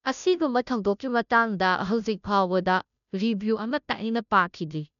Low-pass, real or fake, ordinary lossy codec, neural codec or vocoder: 7.2 kHz; fake; none; codec, 16 kHz, 0.5 kbps, FunCodec, trained on Chinese and English, 25 frames a second